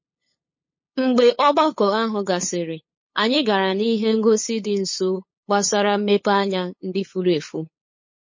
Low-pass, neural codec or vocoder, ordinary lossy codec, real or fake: 7.2 kHz; codec, 16 kHz, 8 kbps, FunCodec, trained on LibriTTS, 25 frames a second; MP3, 32 kbps; fake